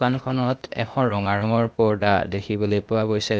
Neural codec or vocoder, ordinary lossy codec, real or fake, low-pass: codec, 16 kHz, 0.8 kbps, ZipCodec; none; fake; none